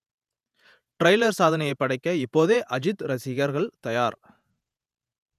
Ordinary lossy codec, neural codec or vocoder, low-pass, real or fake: none; vocoder, 48 kHz, 128 mel bands, Vocos; 14.4 kHz; fake